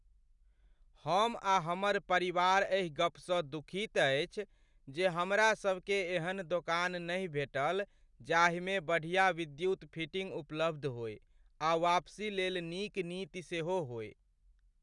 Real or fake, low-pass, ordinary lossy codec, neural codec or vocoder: real; 10.8 kHz; none; none